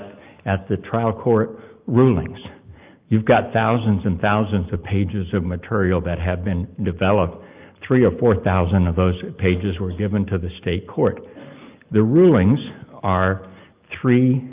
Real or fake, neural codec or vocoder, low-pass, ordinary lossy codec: real; none; 3.6 kHz; Opus, 24 kbps